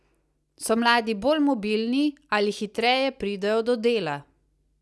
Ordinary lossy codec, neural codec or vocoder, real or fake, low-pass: none; none; real; none